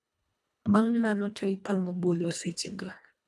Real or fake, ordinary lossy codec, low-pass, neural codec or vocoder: fake; none; none; codec, 24 kHz, 1.5 kbps, HILCodec